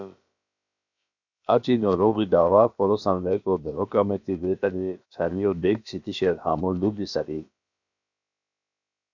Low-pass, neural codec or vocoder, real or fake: 7.2 kHz; codec, 16 kHz, about 1 kbps, DyCAST, with the encoder's durations; fake